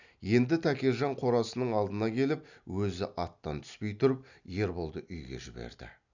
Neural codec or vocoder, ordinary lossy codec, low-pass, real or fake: none; none; 7.2 kHz; real